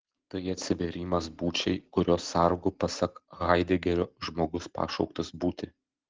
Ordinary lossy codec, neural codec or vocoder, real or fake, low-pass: Opus, 16 kbps; none; real; 7.2 kHz